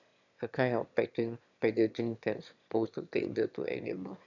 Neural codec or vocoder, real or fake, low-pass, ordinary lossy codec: autoencoder, 22.05 kHz, a latent of 192 numbers a frame, VITS, trained on one speaker; fake; 7.2 kHz; none